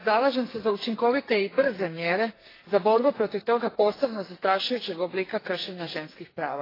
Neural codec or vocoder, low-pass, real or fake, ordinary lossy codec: codec, 44.1 kHz, 2.6 kbps, SNAC; 5.4 kHz; fake; AAC, 24 kbps